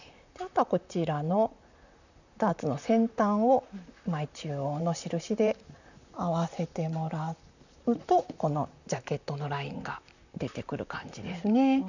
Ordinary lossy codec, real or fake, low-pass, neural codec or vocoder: none; fake; 7.2 kHz; vocoder, 44.1 kHz, 128 mel bands, Pupu-Vocoder